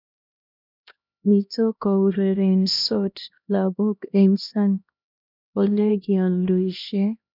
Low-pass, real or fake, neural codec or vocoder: 5.4 kHz; fake; codec, 16 kHz, 1 kbps, X-Codec, HuBERT features, trained on LibriSpeech